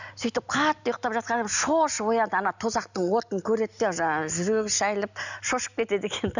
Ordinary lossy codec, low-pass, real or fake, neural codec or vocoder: none; 7.2 kHz; real; none